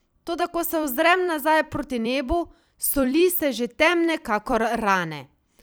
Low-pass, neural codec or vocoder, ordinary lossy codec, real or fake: none; vocoder, 44.1 kHz, 128 mel bands every 256 samples, BigVGAN v2; none; fake